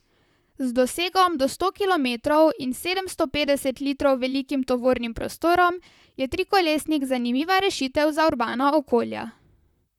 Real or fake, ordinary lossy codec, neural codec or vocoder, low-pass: fake; none; vocoder, 44.1 kHz, 128 mel bands, Pupu-Vocoder; 19.8 kHz